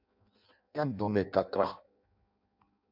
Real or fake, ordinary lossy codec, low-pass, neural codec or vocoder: fake; MP3, 48 kbps; 5.4 kHz; codec, 16 kHz in and 24 kHz out, 0.6 kbps, FireRedTTS-2 codec